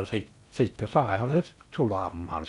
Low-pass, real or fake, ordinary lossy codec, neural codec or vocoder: 10.8 kHz; fake; none; codec, 16 kHz in and 24 kHz out, 0.8 kbps, FocalCodec, streaming, 65536 codes